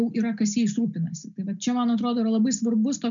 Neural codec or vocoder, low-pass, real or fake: none; 7.2 kHz; real